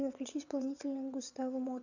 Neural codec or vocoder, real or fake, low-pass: vocoder, 22.05 kHz, 80 mel bands, WaveNeXt; fake; 7.2 kHz